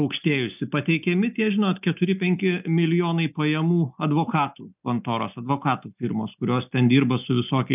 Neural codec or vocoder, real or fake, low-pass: none; real; 3.6 kHz